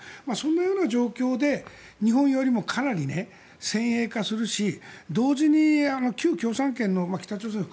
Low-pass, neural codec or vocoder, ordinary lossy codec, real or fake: none; none; none; real